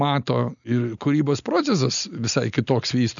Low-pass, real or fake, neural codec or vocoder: 7.2 kHz; real; none